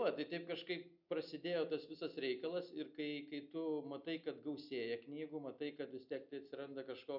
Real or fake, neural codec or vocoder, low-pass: real; none; 5.4 kHz